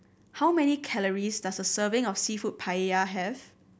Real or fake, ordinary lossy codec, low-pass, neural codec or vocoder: real; none; none; none